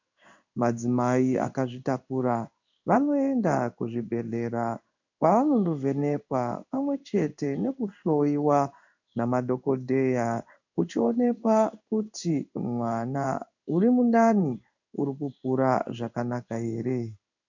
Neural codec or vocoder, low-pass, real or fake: codec, 16 kHz in and 24 kHz out, 1 kbps, XY-Tokenizer; 7.2 kHz; fake